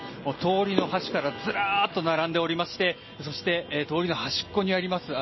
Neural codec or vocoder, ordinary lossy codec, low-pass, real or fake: none; MP3, 24 kbps; 7.2 kHz; real